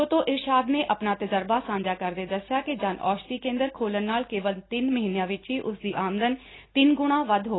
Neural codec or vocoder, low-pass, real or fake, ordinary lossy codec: none; 7.2 kHz; real; AAC, 16 kbps